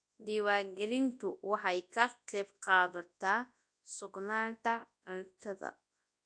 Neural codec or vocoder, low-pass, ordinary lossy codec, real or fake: codec, 24 kHz, 0.9 kbps, WavTokenizer, large speech release; 9.9 kHz; AAC, 64 kbps; fake